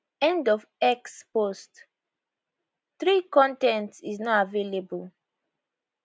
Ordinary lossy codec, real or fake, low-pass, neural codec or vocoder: none; real; none; none